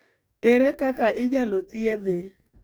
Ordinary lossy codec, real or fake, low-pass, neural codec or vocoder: none; fake; none; codec, 44.1 kHz, 2.6 kbps, DAC